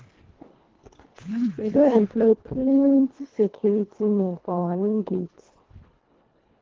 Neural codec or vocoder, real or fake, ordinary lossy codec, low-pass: codec, 24 kHz, 1.5 kbps, HILCodec; fake; Opus, 16 kbps; 7.2 kHz